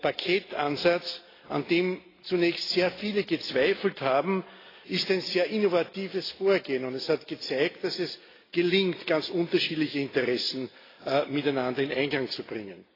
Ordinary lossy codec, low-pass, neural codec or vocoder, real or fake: AAC, 24 kbps; 5.4 kHz; none; real